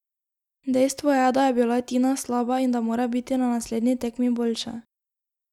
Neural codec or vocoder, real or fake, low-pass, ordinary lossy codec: none; real; 19.8 kHz; none